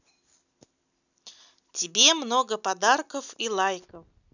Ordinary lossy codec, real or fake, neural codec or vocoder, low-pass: none; real; none; 7.2 kHz